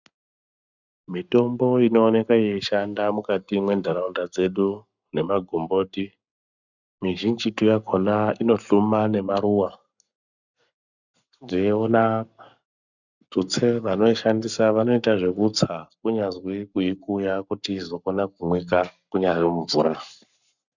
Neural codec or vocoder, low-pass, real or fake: codec, 44.1 kHz, 7.8 kbps, Pupu-Codec; 7.2 kHz; fake